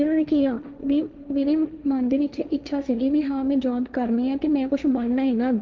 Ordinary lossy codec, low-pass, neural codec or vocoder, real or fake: Opus, 24 kbps; 7.2 kHz; codec, 16 kHz, 1.1 kbps, Voila-Tokenizer; fake